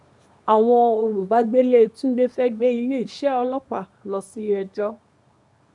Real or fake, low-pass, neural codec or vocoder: fake; 10.8 kHz; codec, 24 kHz, 0.9 kbps, WavTokenizer, small release